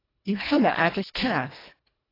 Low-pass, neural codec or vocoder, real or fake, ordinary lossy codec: 5.4 kHz; codec, 24 kHz, 1.5 kbps, HILCodec; fake; AAC, 24 kbps